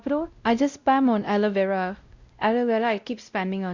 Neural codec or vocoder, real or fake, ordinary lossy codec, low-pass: codec, 16 kHz, 0.5 kbps, X-Codec, WavLM features, trained on Multilingual LibriSpeech; fake; Opus, 64 kbps; 7.2 kHz